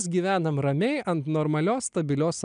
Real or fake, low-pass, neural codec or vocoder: real; 9.9 kHz; none